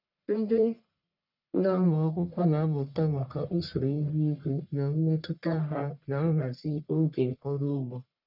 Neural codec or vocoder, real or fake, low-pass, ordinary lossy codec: codec, 44.1 kHz, 1.7 kbps, Pupu-Codec; fake; 5.4 kHz; none